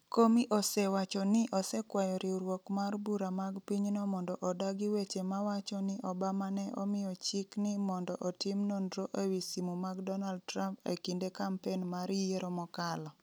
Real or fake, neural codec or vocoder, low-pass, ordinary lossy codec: real; none; none; none